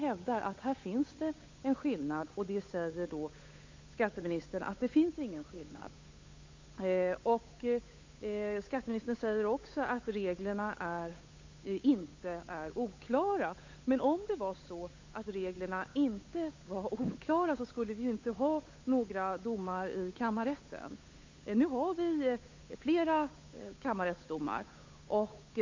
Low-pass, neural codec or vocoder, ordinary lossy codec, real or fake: 7.2 kHz; codec, 16 kHz, 8 kbps, FunCodec, trained on Chinese and English, 25 frames a second; MP3, 48 kbps; fake